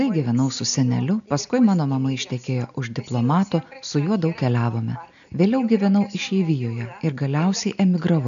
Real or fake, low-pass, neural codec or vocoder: real; 7.2 kHz; none